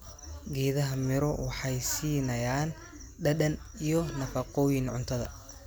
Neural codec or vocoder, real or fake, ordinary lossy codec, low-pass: none; real; none; none